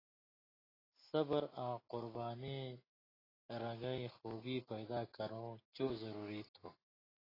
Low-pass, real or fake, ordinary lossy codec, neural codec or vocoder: 5.4 kHz; real; AAC, 24 kbps; none